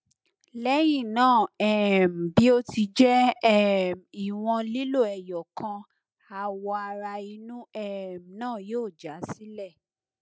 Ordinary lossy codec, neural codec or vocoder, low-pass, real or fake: none; none; none; real